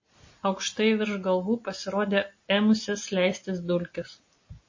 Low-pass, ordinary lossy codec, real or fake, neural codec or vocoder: 7.2 kHz; MP3, 32 kbps; real; none